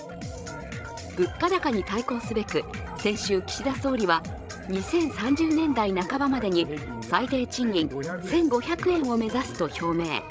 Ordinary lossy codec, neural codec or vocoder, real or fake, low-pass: none; codec, 16 kHz, 16 kbps, FreqCodec, larger model; fake; none